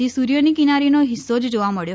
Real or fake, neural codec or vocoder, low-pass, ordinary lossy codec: real; none; none; none